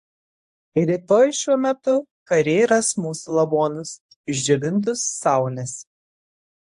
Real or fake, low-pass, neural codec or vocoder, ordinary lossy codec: fake; 10.8 kHz; codec, 24 kHz, 0.9 kbps, WavTokenizer, medium speech release version 1; AAC, 64 kbps